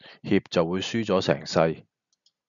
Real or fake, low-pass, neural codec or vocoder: real; 7.2 kHz; none